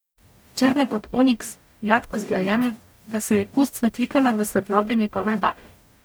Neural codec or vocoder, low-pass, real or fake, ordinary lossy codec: codec, 44.1 kHz, 0.9 kbps, DAC; none; fake; none